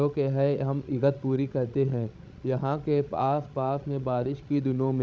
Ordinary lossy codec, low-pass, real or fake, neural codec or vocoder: none; none; fake; codec, 16 kHz, 16 kbps, FreqCodec, larger model